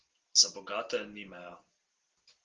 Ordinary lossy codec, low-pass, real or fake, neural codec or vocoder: Opus, 16 kbps; 7.2 kHz; real; none